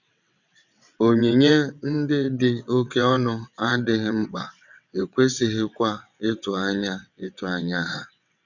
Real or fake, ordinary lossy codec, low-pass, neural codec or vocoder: fake; none; 7.2 kHz; vocoder, 22.05 kHz, 80 mel bands, WaveNeXt